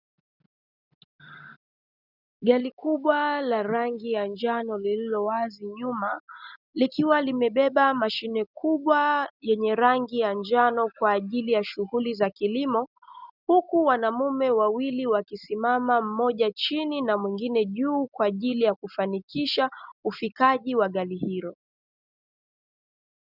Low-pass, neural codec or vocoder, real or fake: 5.4 kHz; none; real